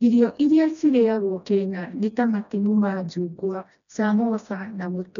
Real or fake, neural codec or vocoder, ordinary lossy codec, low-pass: fake; codec, 16 kHz, 1 kbps, FreqCodec, smaller model; none; 7.2 kHz